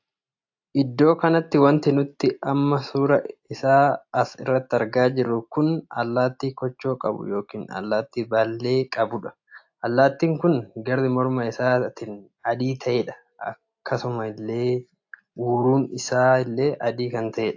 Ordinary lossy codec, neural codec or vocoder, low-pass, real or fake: AAC, 48 kbps; none; 7.2 kHz; real